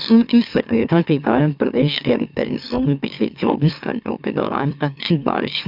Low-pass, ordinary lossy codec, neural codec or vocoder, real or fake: 5.4 kHz; none; autoencoder, 44.1 kHz, a latent of 192 numbers a frame, MeloTTS; fake